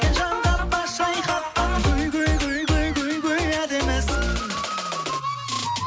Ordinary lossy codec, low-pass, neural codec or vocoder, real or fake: none; none; none; real